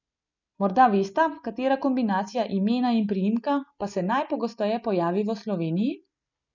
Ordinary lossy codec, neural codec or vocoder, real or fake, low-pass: none; none; real; 7.2 kHz